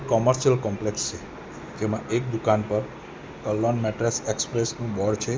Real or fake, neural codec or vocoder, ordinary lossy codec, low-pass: real; none; none; none